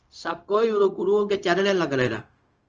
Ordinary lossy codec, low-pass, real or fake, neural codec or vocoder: Opus, 24 kbps; 7.2 kHz; fake; codec, 16 kHz, 0.4 kbps, LongCat-Audio-Codec